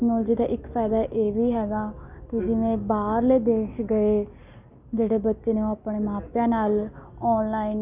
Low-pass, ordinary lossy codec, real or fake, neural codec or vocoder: 3.6 kHz; none; real; none